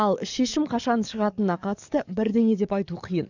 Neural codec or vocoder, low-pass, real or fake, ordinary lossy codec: codec, 44.1 kHz, 7.8 kbps, DAC; 7.2 kHz; fake; none